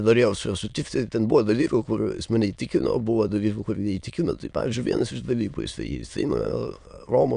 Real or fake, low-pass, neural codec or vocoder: fake; 9.9 kHz; autoencoder, 22.05 kHz, a latent of 192 numbers a frame, VITS, trained on many speakers